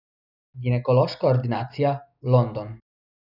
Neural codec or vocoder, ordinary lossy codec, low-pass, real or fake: none; none; 5.4 kHz; real